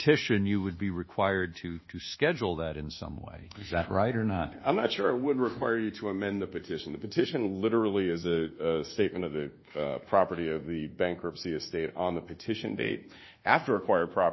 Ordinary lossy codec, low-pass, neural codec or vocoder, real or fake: MP3, 24 kbps; 7.2 kHz; codec, 24 kHz, 1.2 kbps, DualCodec; fake